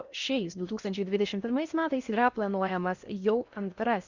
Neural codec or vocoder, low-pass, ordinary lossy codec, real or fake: codec, 16 kHz in and 24 kHz out, 0.6 kbps, FocalCodec, streaming, 4096 codes; 7.2 kHz; Opus, 64 kbps; fake